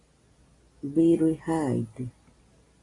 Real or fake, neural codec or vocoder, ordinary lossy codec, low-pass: real; none; AAC, 32 kbps; 10.8 kHz